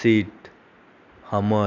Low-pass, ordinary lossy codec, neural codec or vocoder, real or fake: 7.2 kHz; none; none; real